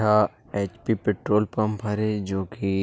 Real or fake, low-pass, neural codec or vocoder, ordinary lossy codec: real; none; none; none